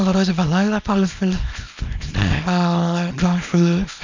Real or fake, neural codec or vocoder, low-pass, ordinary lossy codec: fake; codec, 24 kHz, 0.9 kbps, WavTokenizer, small release; 7.2 kHz; MP3, 64 kbps